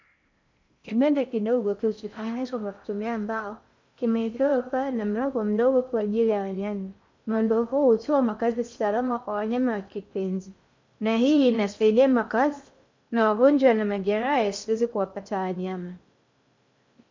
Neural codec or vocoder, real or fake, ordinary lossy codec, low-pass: codec, 16 kHz in and 24 kHz out, 0.6 kbps, FocalCodec, streaming, 4096 codes; fake; MP3, 64 kbps; 7.2 kHz